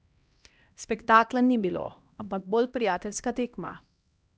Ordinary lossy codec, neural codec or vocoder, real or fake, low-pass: none; codec, 16 kHz, 1 kbps, X-Codec, HuBERT features, trained on LibriSpeech; fake; none